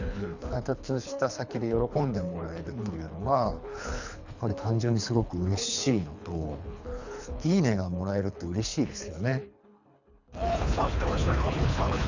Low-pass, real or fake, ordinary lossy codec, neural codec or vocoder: 7.2 kHz; fake; none; codec, 24 kHz, 3 kbps, HILCodec